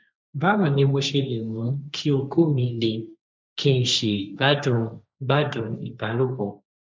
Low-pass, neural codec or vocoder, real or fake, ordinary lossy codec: none; codec, 16 kHz, 1.1 kbps, Voila-Tokenizer; fake; none